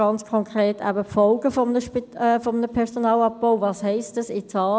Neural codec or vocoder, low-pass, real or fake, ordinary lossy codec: none; none; real; none